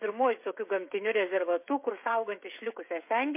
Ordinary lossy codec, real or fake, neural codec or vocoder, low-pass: MP3, 24 kbps; fake; codec, 16 kHz, 16 kbps, FreqCodec, smaller model; 3.6 kHz